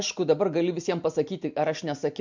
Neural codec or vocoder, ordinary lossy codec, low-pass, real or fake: none; MP3, 64 kbps; 7.2 kHz; real